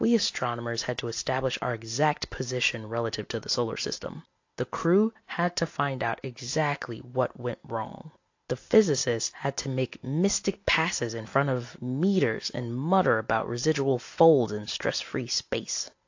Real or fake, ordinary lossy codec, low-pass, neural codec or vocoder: real; AAC, 48 kbps; 7.2 kHz; none